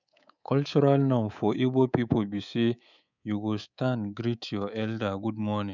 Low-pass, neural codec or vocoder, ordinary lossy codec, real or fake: 7.2 kHz; codec, 24 kHz, 3.1 kbps, DualCodec; none; fake